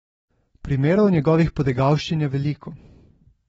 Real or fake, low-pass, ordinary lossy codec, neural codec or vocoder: real; 14.4 kHz; AAC, 24 kbps; none